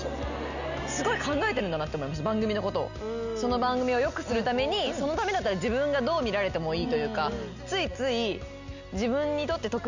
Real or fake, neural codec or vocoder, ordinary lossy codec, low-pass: real; none; none; 7.2 kHz